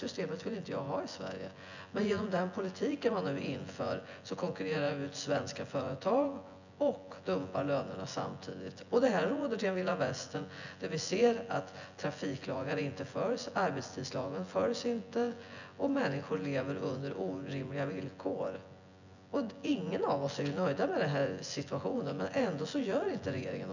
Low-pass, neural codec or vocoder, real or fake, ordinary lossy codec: 7.2 kHz; vocoder, 24 kHz, 100 mel bands, Vocos; fake; none